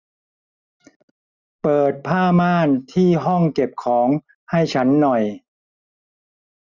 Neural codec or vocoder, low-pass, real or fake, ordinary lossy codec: none; 7.2 kHz; real; none